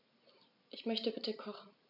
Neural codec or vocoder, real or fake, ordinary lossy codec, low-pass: none; real; none; 5.4 kHz